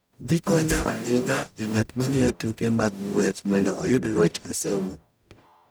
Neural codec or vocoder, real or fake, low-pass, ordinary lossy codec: codec, 44.1 kHz, 0.9 kbps, DAC; fake; none; none